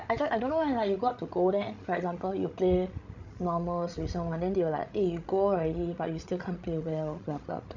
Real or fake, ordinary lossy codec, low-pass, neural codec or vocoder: fake; none; 7.2 kHz; codec, 16 kHz, 16 kbps, FreqCodec, larger model